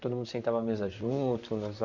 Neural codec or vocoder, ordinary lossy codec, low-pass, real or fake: vocoder, 44.1 kHz, 128 mel bands, Pupu-Vocoder; none; 7.2 kHz; fake